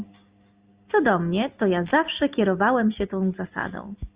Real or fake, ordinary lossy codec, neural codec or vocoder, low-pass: real; Opus, 64 kbps; none; 3.6 kHz